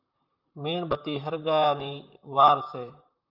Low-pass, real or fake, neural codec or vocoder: 5.4 kHz; fake; vocoder, 44.1 kHz, 128 mel bands, Pupu-Vocoder